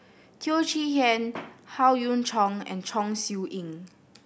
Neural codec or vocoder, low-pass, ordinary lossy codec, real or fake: none; none; none; real